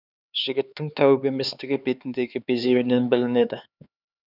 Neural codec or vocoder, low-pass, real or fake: codec, 16 kHz, 4 kbps, X-Codec, HuBERT features, trained on LibriSpeech; 5.4 kHz; fake